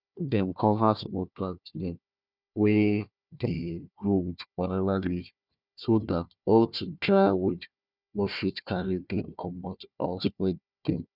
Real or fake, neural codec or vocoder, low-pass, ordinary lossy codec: fake; codec, 16 kHz, 1 kbps, FunCodec, trained on Chinese and English, 50 frames a second; 5.4 kHz; none